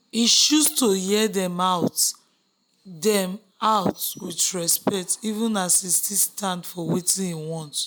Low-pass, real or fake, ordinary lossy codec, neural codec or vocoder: none; real; none; none